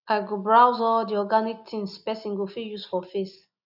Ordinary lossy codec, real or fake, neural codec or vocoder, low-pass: none; real; none; 5.4 kHz